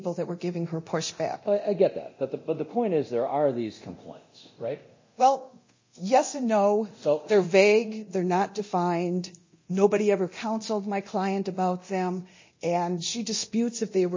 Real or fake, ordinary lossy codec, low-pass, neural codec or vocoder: fake; MP3, 32 kbps; 7.2 kHz; codec, 24 kHz, 0.9 kbps, DualCodec